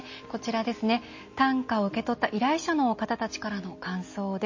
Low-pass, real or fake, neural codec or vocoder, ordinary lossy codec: 7.2 kHz; real; none; MP3, 32 kbps